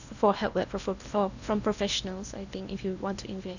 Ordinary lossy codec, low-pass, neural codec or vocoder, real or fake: none; 7.2 kHz; codec, 16 kHz in and 24 kHz out, 0.8 kbps, FocalCodec, streaming, 65536 codes; fake